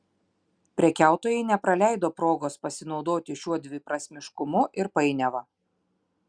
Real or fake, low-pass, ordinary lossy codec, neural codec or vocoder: real; 9.9 kHz; Opus, 64 kbps; none